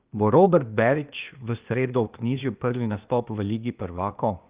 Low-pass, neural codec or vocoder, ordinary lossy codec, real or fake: 3.6 kHz; codec, 16 kHz, 0.8 kbps, ZipCodec; Opus, 24 kbps; fake